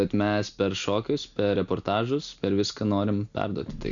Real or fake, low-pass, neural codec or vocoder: real; 7.2 kHz; none